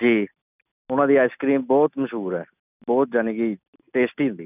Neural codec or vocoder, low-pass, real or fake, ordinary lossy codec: none; 3.6 kHz; real; none